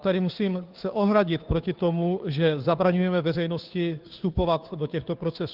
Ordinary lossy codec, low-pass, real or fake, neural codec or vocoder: Opus, 32 kbps; 5.4 kHz; fake; codec, 16 kHz, 2 kbps, FunCodec, trained on Chinese and English, 25 frames a second